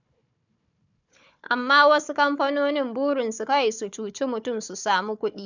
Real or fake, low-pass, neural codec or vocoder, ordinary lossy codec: fake; 7.2 kHz; codec, 16 kHz, 4 kbps, FunCodec, trained on Chinese and English, 50 frames a second; none